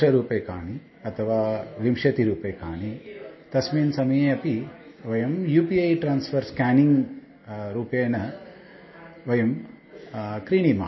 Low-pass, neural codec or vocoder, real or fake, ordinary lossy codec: 7.2 kHz; none; real; MP3, 24 kbps